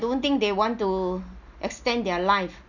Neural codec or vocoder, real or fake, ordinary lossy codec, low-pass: none; real; none; 7.2 kHz